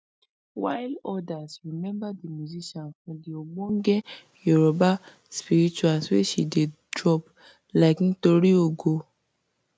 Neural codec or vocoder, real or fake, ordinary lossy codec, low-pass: none; real; none; none